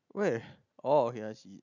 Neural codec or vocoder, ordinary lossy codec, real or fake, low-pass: none; none; real; 7.2 kHz